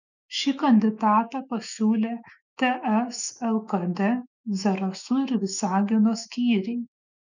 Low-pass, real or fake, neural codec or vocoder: 7.2 kHz; fake; autoencoder, 48 kHz, 128 numbers a frame, DAC-VAE, trained on Japanese speech